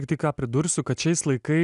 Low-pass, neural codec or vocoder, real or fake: 10.8 kHz; none; real